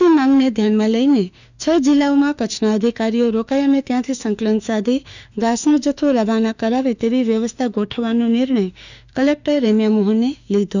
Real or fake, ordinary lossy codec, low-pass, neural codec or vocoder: fake; none; 7.2 kHz; autoencoder, 48 kHz, 32 numbers a frame, DAC-VAE, trained on Japanese speech